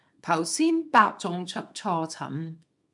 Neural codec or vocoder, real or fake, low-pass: codec, 24 kHz, 0.9 kbps, WavTokenizer, small release; fake; 10.8 kHz